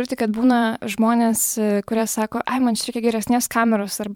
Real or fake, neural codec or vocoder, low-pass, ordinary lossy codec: fake; vocoder, 44.1 kHz, 128 mel bands every 512 samples, BigVGAN v2; 19.8 kHz; MP3, 96 kbps